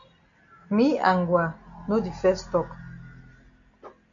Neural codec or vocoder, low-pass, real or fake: none; 7.2 kHz; real